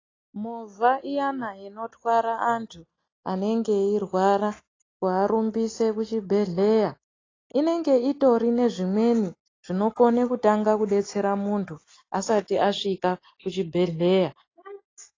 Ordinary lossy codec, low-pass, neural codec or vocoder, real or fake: AAC, 32 kbps; 7.2 kHz; none; real